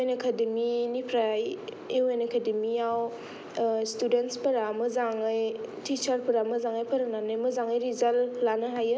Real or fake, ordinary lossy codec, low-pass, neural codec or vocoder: real; none; none; none